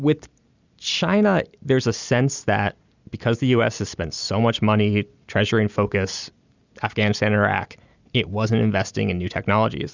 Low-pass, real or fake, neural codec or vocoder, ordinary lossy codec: 7.2 kHz; real; none; Opus, 64 kbps